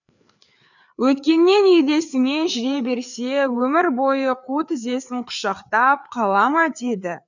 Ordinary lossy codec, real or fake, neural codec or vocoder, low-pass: none; fake; codec, 16 kHz, 4 kbps, FreqCodec, larger model; 7.2 kHz